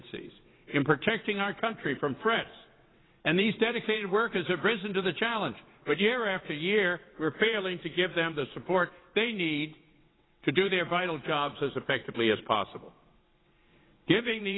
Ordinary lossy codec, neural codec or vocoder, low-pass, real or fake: AAC, 16 kbps; vocoder, 22.05 kHz, 80 mel bands, WaveNeXt; 7.2 kHz; fake